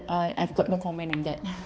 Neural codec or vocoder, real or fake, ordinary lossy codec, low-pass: codec, 16 kHz, 2 kbps, X-Codec, HuBERT features, trained on balanced general audio; fake; none; none